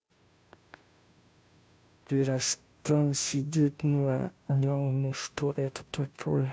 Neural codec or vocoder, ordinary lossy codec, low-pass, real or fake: codec, 16 kHz, 0.5 kbps, FunCodec, trained on Chinese and English, 25 frames a second; none; none; fake